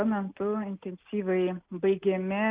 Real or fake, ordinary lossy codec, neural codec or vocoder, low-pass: real; Opus, 32 kbps; none; 3.6 kHz